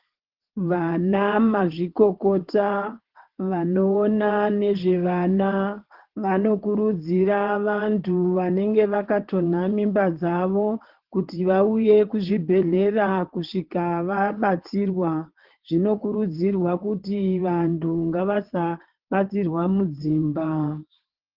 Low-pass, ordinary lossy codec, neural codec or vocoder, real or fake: 5.4 kHz; Opus, 16 kbps; vocoder, 22.05 kHz, 80 mel bands, WaveNeXt; fake